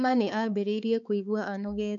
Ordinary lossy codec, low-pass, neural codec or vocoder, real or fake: none; 7.2 kHz; codec, 16 kHz, 4 kbps, X-Codec, HuBERT features, trained on balanced general audio; fake